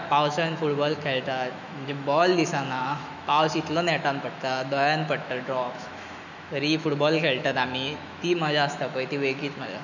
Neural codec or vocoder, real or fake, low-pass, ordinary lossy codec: autoencoder, 48 kHz, 128 numbers a frame, DAC-VAE, trained on Japanese speech; fake; 7.2 kHz; none